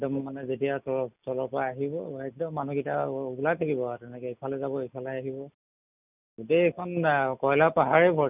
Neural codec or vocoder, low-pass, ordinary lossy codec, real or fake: none; 3.6 kHz; none; real